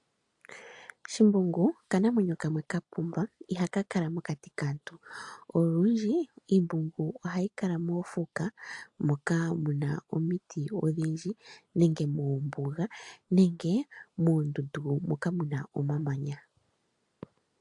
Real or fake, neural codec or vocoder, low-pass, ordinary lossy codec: real; none; 10.8 kHz; AAC, 64 kbps